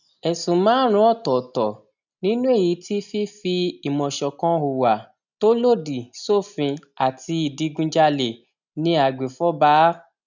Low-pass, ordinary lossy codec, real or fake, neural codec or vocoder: 7.2 kHz; none; real; none